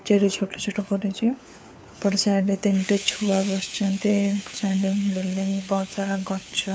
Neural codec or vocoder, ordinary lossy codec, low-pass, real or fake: codec, 16 kHz, 4 kbps, FunCodec, trained on LibriTTS, 50 frames a second; none; none; fake